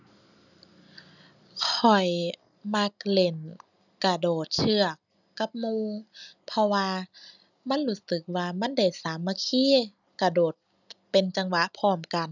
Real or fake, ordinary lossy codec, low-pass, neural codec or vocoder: real; none; 7.2 kHz; none